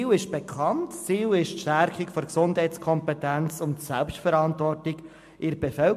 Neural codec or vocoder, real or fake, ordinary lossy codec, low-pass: none; real; MP3, 64 kbps; 14.4 kHz